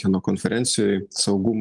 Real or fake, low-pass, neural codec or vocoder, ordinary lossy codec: real; 10.8 kHz; none; Opus, 24 kbps